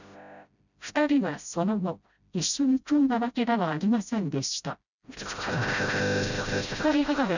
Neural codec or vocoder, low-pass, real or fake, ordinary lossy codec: codec, 16 kHz, 0.5 kbps, FreqCodec, smaller model; 7.2 kHz; fake; none